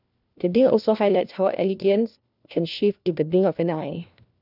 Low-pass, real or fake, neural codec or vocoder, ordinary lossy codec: 5.4 kHz; fake; codec, 16 kHz, 1 kbps, FunCodec, trained on LibriTTS, 50 frames a second; none